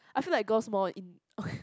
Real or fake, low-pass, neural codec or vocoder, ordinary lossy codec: real; none; none; none